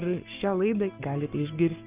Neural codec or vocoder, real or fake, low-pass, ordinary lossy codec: codec, 44.1 kHz, 7.8 kbps, Pupu-Codec; fake; 3.6 kHz; Opus, 24 kbps